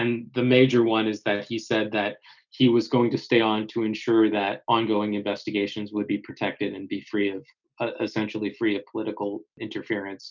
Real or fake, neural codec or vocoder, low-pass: real; none; 7.2 kHz